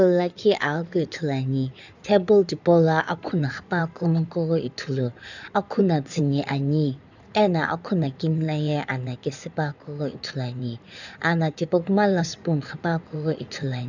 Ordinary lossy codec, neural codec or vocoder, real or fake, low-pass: none; codec, 16 kHz in and 24 kHz out, 2.2 kbps, FireRedTTS-2 codec; fake; 7.2 kHz